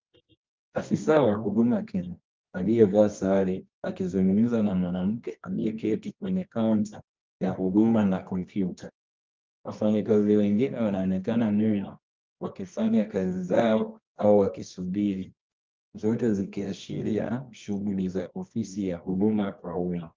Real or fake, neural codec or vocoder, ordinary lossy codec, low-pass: fake; codec, 24 kHz, 0.9 kbps, WavTokenizer, medium music audio release; Opus, 32 kbps; 7.2 kHz